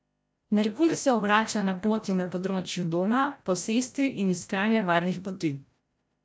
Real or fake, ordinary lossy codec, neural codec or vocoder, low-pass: fake; none; codec, 16 kHz, 0.5 kbps, FreqCodec, larger model; none